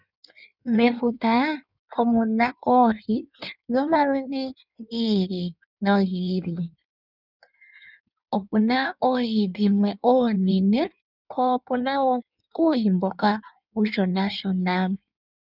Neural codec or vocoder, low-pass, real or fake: codec, 16 kHz in and 24 kHz out, 1.1 kbps, FireRedTTS-2 codec; 5.4 kHz; fake